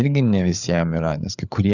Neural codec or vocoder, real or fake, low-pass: codec, 16 kHz, 8 kbps, FreqCodec, larger model; fake; 7.2 kHz